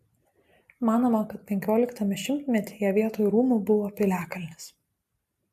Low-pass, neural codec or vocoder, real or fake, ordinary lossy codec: 14.4 kHz; none; real; AAC, 96 kbps